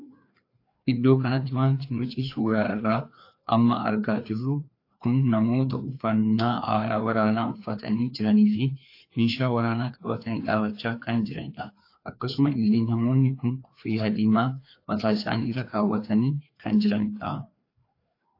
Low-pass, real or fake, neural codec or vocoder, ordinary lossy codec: 5.4 kHz; fake; codec, 16 kHz, 2 kbps, FreqCodec, larger model; AAC, 32 kbps